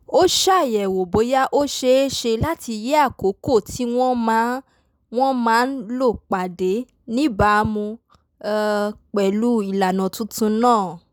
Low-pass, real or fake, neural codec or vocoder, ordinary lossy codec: none; real; none; none